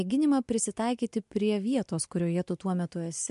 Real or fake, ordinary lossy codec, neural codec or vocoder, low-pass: real; MP3, 64 kbps; none; 10.8 kHz